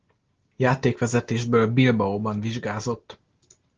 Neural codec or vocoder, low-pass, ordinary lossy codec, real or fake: none; 7.2 kHz; Opus, 16 kbps; real